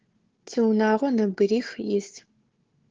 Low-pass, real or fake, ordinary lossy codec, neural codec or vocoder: 7.2 kHz; fake; Opus, 16 kbps; codec, 16 kHz, 4 kbps, FunCodec, trained on Chinese and English, 50 frames a second